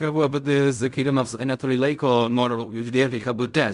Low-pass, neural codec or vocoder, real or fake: 10.8 kHz; codec, 16 kHz in and 24 kHz out, 0.4 kbps, LongCat-Audio-Codec, fine tuned four codebook decoder; fake